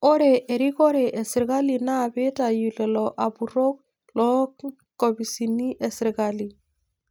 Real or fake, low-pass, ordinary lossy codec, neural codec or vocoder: real; none; none; none